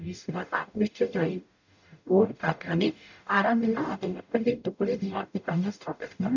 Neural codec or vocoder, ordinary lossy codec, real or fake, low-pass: codec, 44.1 kHz, 0.9 kbps, DAC; none; fake; 7.2 kHz